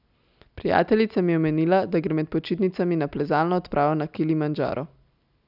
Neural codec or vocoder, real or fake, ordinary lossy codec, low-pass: none; real; none; 5.4 kHz